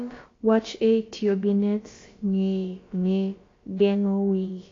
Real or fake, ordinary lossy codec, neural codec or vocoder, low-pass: fake; AAC, 32 kbps; codec, 16 kHz, about 1 kbps, DyCAST, with the encoder's durations; 7.2 kHz